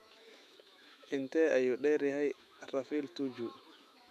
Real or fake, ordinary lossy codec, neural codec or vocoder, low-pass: fake; none; autoencoder, 48 kHz, 128 numbers a frame, DAC-VAE, trained on Japanese speech; 14.4 kHz